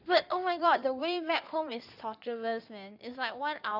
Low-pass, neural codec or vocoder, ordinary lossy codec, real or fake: 5.4 kHz; codec, 16 kHz in and 24 kHz out, 2.2 kbps, FireRedTTS-2 codec; none; fake